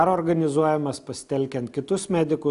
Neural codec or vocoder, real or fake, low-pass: none; real; 10.8 kHz